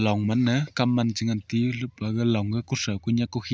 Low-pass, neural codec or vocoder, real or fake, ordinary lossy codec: none; none; real; none